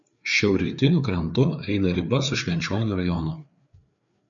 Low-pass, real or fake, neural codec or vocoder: 7.2 kHz; fake; codec, 16 kHz, 4 kbps, FreqCodec, larger model